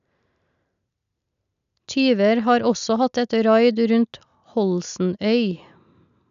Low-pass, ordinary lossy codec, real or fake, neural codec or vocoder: 7.2 kHz; none; real; none